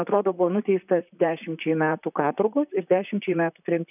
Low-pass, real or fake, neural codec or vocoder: 3.6 kHz; fake; vocoder, 44.1 kHz, 128 mel bands, Pupu-Vocoder